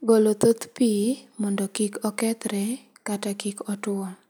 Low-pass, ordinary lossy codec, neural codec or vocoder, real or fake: none; none; none; real